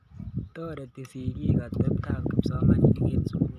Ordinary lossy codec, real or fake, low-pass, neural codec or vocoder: none; real; 14.4 kHz; none